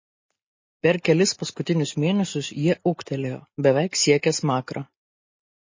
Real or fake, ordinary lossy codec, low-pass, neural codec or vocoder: real; MP3, 32 kbps; 7.2 kHz; none